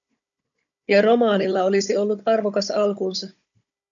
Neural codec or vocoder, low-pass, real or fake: codec, 16 kHz, 16 kbps, FunCodec, trained on Chinese and English, 50 frames a second; 7.2 kHz; fake